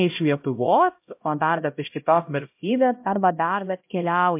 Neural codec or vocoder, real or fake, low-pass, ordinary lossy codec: codec, 16 kHz, 0.5 kbps, X-Codec, HuBERT features, trained on LibriSpeech; fake; 3.6 kHz; MP3, 32 kbps